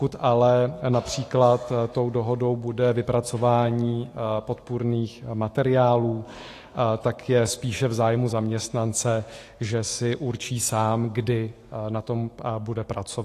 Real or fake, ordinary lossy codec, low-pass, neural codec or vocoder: fake; AAC, 48 kbps; 14.4 kHz; autoencoder, 48 kHz, 128 numbers a frame, DAC-VAE, trained on Japanese speech